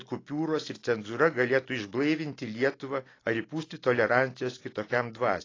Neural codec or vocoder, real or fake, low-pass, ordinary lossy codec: none; real; 7.2 kHz; AAC, 32 kbps